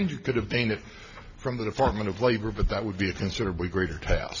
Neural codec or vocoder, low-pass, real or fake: none; 7.2 kHz; real